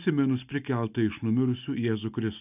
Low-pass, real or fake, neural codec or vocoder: 3.6 kHz; real; none